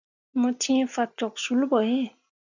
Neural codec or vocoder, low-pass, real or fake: none; 7.2 kHz; real